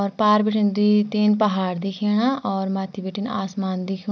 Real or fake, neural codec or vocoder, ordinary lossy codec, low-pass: real; none; none; none